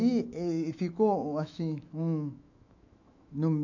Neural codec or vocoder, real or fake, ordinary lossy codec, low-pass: autoencoder, 48 kHz, 128 numbers a frame, DAC-VAE, trained on Japanese speech; fake; none; 7.2 kHz